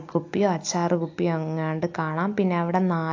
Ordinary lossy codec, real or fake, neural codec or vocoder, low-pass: AAC, 48 kbps; real; none; 7.2 kHz